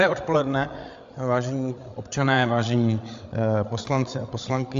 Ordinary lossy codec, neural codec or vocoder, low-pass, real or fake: AAC, 64 kbps; codec, 16 kHz, 8 kbps, FreqCodec, larger model; 7.2 kHz; fake